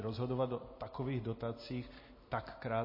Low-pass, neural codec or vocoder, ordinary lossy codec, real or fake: 5.4 kHz; none; MP3, 24 kbps; real